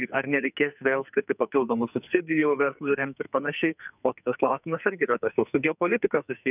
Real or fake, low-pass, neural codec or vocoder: fake; 3.6 kHz; codec, 44.1 kHz, 2.6 kbps, SNAC